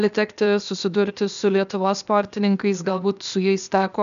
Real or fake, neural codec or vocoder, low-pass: fake; codec, 16 kHz, 0.8 kbps, ZipCodec; 7.2 kHz